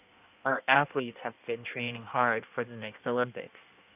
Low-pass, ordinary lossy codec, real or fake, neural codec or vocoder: 3.6 kHz; none; fake; codec, 16 kHz in and 24 kHz out, 1.1 kbps, FireRedTTS-2 codec